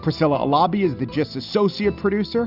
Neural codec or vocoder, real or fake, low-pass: none; real; 5.4 kHz